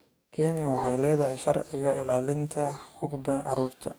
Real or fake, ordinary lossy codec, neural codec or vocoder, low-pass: fake; none; codec, 44.1 kHz, 2.6 kbps, DAC; none